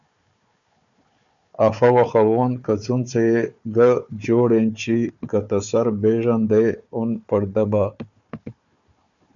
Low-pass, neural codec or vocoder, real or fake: 7.2 kHz; codec, 16 kHz, 4 kbps, FunCodec, trained on Chinese and English, 50 frames a second; fake